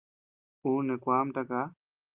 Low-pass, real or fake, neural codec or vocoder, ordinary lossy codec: 3.6 kHz; real; none; Opus, 24 kbps